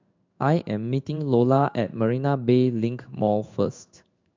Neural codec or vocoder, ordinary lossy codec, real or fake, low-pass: codec, 16 kHz in and 24 kHz out, 1 kbps, XY-Tokenizer; MP3, 64 kbps; fake; 7.2 kHz